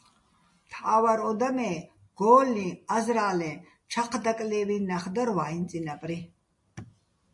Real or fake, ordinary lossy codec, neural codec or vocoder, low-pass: real; MP3, 48 kbps; none; 10.8 kHz